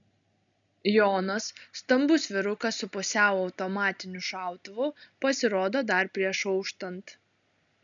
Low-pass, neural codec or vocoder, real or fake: 7.2 kHz; none; real